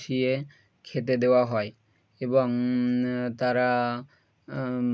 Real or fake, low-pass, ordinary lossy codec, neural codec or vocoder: real; none; none; none